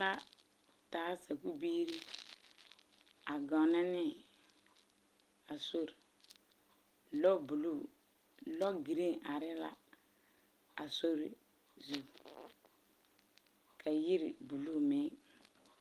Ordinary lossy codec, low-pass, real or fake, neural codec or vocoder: Opus, 24 kbps; 14.4 kHz; real; none